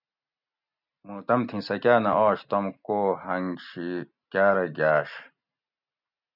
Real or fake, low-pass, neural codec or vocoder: real; 5.4 kHz; none